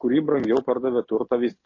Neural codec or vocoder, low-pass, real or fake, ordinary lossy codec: none; 7.2 kHz; real; MP3, 32 kbps